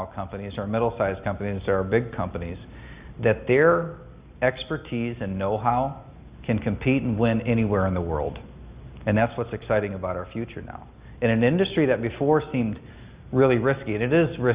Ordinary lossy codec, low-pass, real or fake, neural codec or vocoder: Opus, 64 kbps; 3.6 kHz; real; none